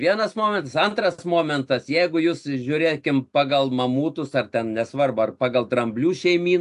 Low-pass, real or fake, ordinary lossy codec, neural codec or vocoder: 10.8 kHz; real; AAC, 64 kbps; none